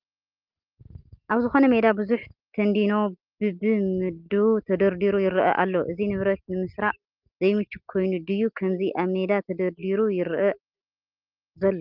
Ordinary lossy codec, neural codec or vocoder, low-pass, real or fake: Opus, 32 kbps; none; 5.4 kHz; real